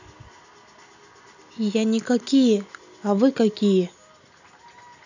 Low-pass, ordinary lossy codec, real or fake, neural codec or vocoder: 7.2 kHz; none; real; none